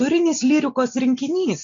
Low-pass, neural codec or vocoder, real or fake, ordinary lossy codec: 7.2 kHz; none; real; MP3, 64 kbps